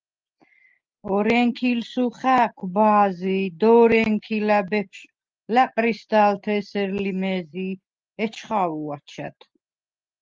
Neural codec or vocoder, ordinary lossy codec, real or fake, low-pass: none; Opus, 24 kbps; real; 7.2 kHz